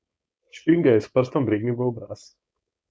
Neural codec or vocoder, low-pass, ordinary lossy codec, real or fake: codec, 16 kHz, 4.8 kbps, FACodec; none; none; fake